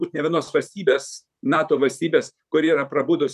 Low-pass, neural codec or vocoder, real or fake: 14.4 kHz; vocoder, 44.1 kHz, 128 mel bands, Pupu-Vocoder; fake